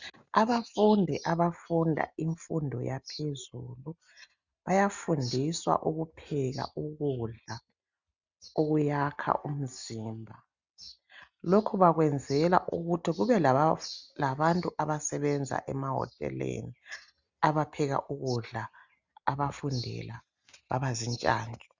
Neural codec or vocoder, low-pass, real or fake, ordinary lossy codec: none; 7.2 kHz; real; Opus, 64 kbps